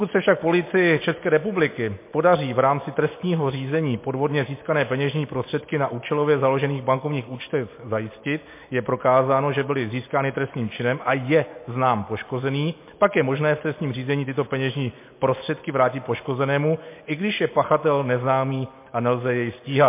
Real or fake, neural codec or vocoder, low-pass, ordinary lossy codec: real; none; 3.6 kHz; MP3, 24 kbps